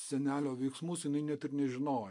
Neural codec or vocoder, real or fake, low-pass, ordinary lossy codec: none; real; 10.8 kHz; MP3, 64 kbps